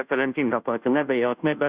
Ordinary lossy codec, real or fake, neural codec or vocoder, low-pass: Opus, 64 kbps; fake; codec, 16 kHz, 0.5 kbps, FunCodec, trained on Chinese and English, 25 frames a second; 3.6 kHz